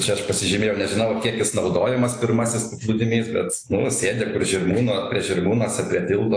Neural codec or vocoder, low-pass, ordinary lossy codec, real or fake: none; 9.9 kHz; AAC, 48 kbps; real